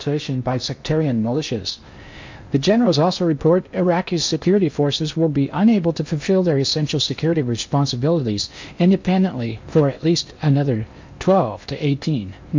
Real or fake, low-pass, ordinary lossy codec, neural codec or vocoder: fake; 7.2 kHz; MP3, 64 kbps; codec, 16 kHz in and 24 kHz out, 0.8 kbps, FocalCodec, streaming, 65536 codes